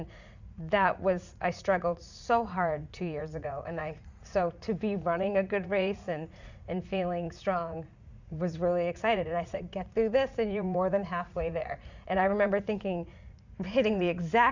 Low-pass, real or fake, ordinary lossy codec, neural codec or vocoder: 7.2 kHz; fake; Opus, 64 kbps; vocoder, 44.1 kHz, 80 mel bands, Vocos